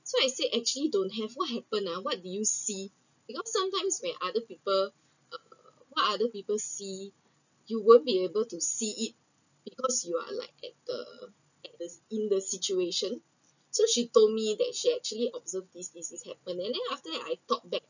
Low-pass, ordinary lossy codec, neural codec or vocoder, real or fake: 7.2 kHz; none; none; real